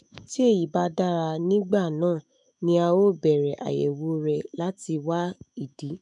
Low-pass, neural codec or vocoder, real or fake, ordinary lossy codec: 10.8 kHz; autoencoder, 48 kHz, 128 numbers a frame, DAC-VAE, trained on Japanese speech; fake; none